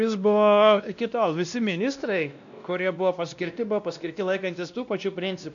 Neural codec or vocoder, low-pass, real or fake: codec, 16 kHz, 1 kbps, X-Codec, WavLM features, trained on Multilingual LibriSpeech; 7.2 kHz; fake